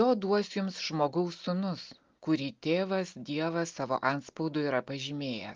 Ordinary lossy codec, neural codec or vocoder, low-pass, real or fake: Opus, 32 kbps; none; 7.2 kHz; real